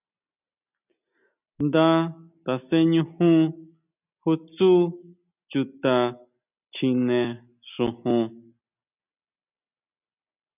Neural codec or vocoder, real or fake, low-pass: none; real; 3.6 kHz